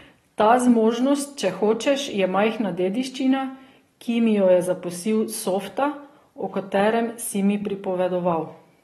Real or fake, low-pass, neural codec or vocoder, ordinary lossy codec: real; 19.8 kHz; none; AAC, 32 kbps